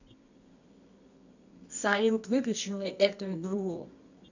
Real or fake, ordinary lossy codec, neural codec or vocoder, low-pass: fake; none; codec, 24 kHz, 0.9 kbps, WavTokenizer, medium music audio release; 7.2 kHz